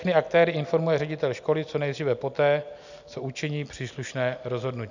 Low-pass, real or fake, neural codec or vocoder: 7.2 kHz; real; none